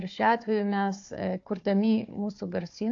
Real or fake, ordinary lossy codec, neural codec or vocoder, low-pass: fake; AAC, 48 kbps; codec, 16 kHz, 4 kbps, FunCodec, trained on Chinese and English, 50 frames a second; 7.2 kHz